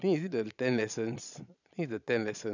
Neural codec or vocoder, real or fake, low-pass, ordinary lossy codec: vocoder, 22.05 kHz, 80 mel bands, Vocos; fake; 7.2 kHz; none